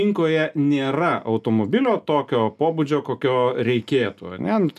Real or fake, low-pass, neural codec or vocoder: real; 14.4 kHz; none